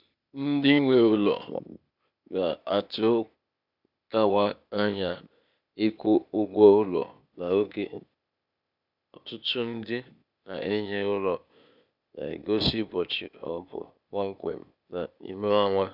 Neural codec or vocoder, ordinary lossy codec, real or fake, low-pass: codec, 16 kHz, 0.8 kbps, ZipCodec; none; fake; 5.4 kHz